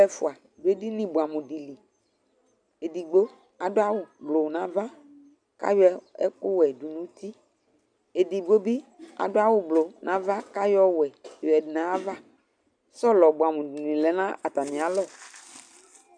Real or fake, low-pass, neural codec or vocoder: real; 9.9 kHz; none